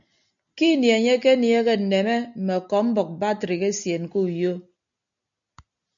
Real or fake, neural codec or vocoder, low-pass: real; none; 7.2 kHz